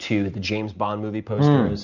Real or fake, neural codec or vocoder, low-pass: real; none; 7.2 kHz